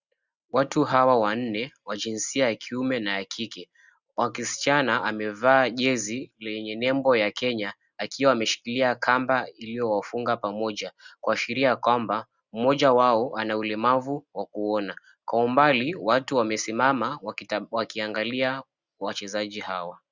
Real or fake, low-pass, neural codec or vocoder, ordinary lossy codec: real; 7.2 kHz; none; Opus, 64 kbps